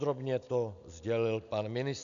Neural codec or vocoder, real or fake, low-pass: none; real; 7.2 kHz